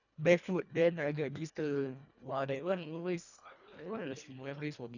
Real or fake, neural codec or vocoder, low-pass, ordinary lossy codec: fake; codec, 24 kHz, 1.5 kbps, HILCodec; 7.2 kHz; none